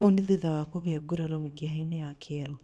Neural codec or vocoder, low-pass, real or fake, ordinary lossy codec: codec, 24 kHz, 0.9 kbps, WavTokenizer, small release; none; fake; none